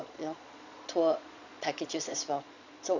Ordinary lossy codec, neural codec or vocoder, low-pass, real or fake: none; vocoder, 22.05 kHz, 80 mel bands, Vocos; 7.2 kHz; fake